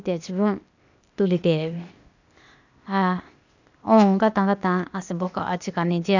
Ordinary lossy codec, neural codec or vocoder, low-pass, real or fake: none; codec, 16 kHz, 0.8 kbps, ZipCodec; 7.2 kHz; fake